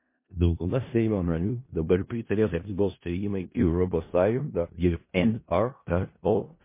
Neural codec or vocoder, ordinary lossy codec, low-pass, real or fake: codec, 16 kHz in and 24 kHz out, 0.4 kbps, LongCat-Audio-Codec, four codebook decoder; MP3, 24 kbps; 3.6 kHz; fake